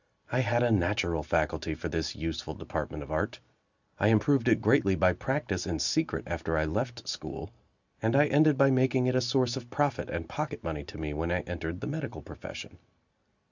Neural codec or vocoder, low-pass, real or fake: none; 7.2 kHz; real